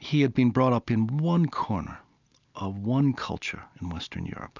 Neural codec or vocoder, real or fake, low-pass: none; real; 7.2 kHz